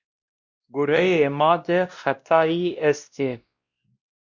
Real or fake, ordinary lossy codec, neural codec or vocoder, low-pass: fake; Opus, 64 kbps; codec, 16 kHz, 1 kbps, X-Codec, WavLM features, trained on Multilingual LibriSpeech; 7.2 kHz